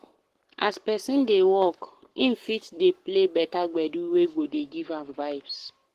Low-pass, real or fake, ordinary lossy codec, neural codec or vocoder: 14.4 kHz; fake; Opus, 16 kbps; codec, 44.1 kHz, 7.8 kbps, Pupu-Codec